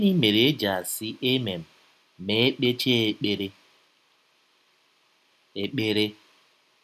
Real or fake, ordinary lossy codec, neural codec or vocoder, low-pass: real; none; none; 14.4 kHz